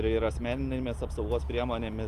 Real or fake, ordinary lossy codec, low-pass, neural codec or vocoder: real; Opus, 32 kbps; 14.4 kHz; none